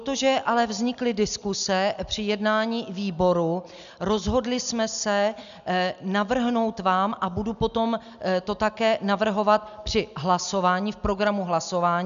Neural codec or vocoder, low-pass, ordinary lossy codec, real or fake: none; 7.2 kHz; MP3, 96 kbps; real